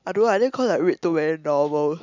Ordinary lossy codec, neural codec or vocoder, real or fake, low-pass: none; none; real; 7.2 kHz